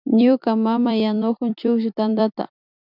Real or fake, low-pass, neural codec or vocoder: fake; 5.4 kHz; vocoder, 44.1 kHz, 128 mel bands every 256 samples, BigVGAN v2